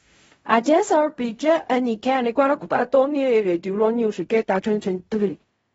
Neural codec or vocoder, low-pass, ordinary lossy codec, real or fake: codec, 16 kHz in and 24 kHz out, 0.4 kbps, LongCat-Audio-Codec, fine tuned four codebook decoder; 10.8 kHz; AAC, 24 kbps; fake